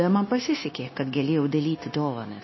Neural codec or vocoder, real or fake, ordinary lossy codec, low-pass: codec, 16 kHz, 0.9 kbps, LongCat-Audio-Codec; fake; MP3, 24 kbps; 7.2 kHz